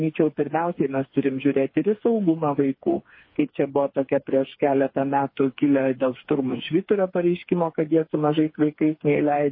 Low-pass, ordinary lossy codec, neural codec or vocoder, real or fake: 5.4 kHz; MP3, 24 kbps; codec, 16 kHz, 4 kbps, FreqCodec, smaller model; fake